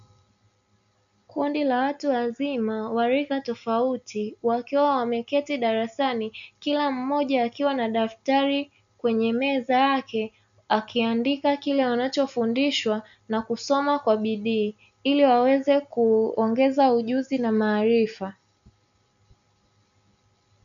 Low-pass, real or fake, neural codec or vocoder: 7.2 kHz; real; none